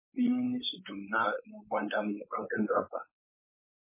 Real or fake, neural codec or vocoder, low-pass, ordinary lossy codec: fake; codec, 16 kHz, 4.8 kbps, FACodec; 3.6 kHz; MP3, 16 kbps